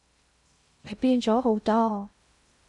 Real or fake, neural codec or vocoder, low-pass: fake; codec, 16 kHz in and 24 kHz out, 0.6 kbps, FocalCodec, streaming, 2048 codes; 10.8 kHz